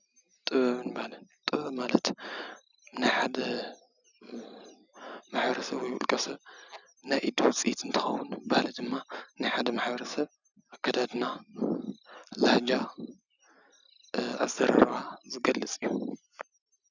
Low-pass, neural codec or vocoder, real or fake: 7.2 kHz; none; real